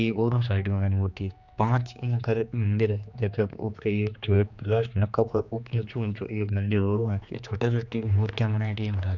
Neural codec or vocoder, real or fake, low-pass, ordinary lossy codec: codec, 16 kHz, 2 kbps, X-Codec, HuBERT features, trained on general audio; fake; 7.2 kHz; none